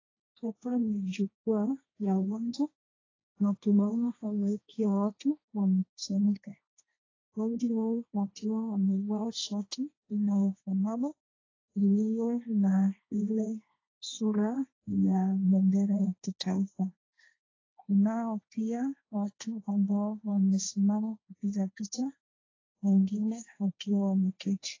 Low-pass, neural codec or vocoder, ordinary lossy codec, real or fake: 7.2 kHz; codec, 16 kHz, 1.1 kbps, Voila-Tokenizer; AAC, 32 kbps; fake